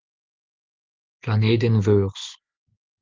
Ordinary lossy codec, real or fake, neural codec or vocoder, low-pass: Opus, 16 kbps; real; none; 7.2 kHz